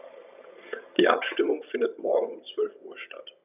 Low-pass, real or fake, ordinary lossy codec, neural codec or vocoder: 3.6 kHz; fake; none; vocoder, 22.05 kHz, 80 mel bands, HiFi-GAN